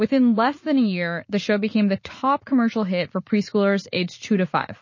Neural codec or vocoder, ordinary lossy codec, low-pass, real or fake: vocoder, 44.1 kHz, 80 mel bands, Vocos; MP3, 32 kbps; 7.2 kHz; fake